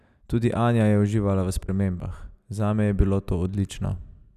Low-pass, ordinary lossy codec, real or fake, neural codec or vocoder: 14.4 kHz; none; real; none